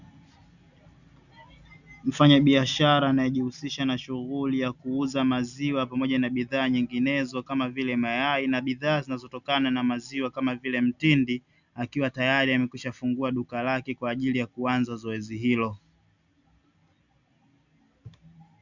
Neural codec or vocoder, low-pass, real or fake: none; 7.2 kHz; real